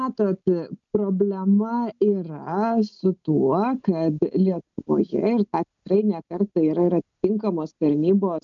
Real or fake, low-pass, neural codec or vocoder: real; 7.2 kHz; none